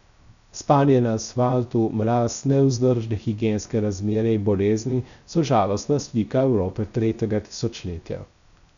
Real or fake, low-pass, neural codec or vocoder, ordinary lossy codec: fake; 7.2 kHz; codec, 16 kHz, 0.3 kbps, FocalCodec; none